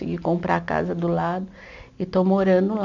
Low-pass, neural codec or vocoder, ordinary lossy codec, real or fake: 7.2 kHz; none; none; real